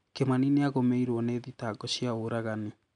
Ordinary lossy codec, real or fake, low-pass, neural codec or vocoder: none; real; 9.9 kHz; none